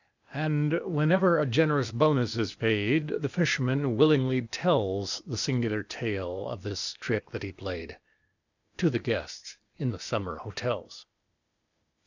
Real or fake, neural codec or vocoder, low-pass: fake; codec, 16 kHz, 0.8 kbps, ZipCodec; 7.2 kHz